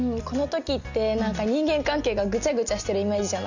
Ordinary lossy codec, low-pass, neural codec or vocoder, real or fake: none; 7.2 kHz; none; real